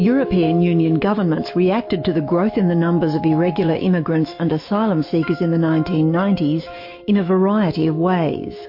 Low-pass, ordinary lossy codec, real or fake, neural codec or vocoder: 5.4 kHz; MP3, 32 kbps; fake; autoencoder, 48 kHz, 128 numbers a frame, DAC-VAE, trained on Japanese speech